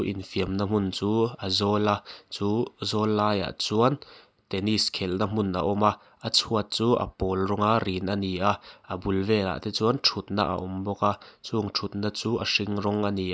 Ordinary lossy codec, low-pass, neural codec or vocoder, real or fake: none; none; none; real